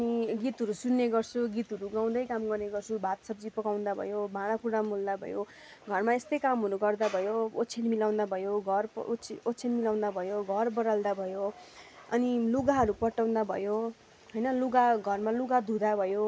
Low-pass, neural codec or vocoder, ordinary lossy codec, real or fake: none; none; none; real